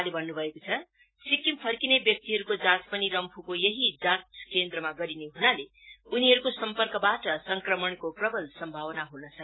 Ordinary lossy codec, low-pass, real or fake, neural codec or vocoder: AAC, 16 kbps; 7.2 kHz; real; none